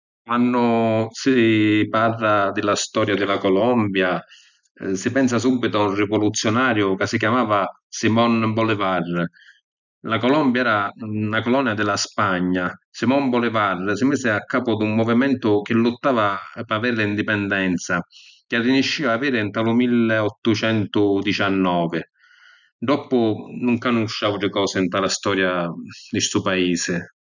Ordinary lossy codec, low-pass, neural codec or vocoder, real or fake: none; 7.2 kHz; none; real